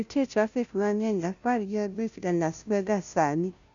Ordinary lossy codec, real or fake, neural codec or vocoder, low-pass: none; fake; codec, 16 kHz, 0.5 kbps, FunCodec, trained on Chinese and English, 25 frames a second; 7.2 kHz